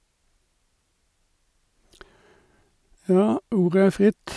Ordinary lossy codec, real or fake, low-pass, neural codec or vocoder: none; real; none; none